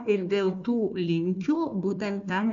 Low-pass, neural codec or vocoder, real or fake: 7.2 kHz; codec, 16 kHz, 1 kbps, FunCodec, trained on Chinese and English, 50 frames a second; fake